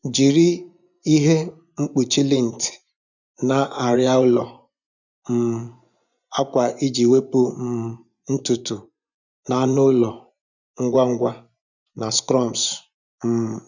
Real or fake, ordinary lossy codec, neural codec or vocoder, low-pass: fake; none; vocoder, 24 kHz, 100 mel bands, Vocos; 7.2 kHz